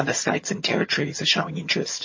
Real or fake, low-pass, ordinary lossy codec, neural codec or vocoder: fake; 7.2 kHz; MP3, 32 kbps; vocoder, 22.05 kHz, 80 mel bands, HiFi-GAN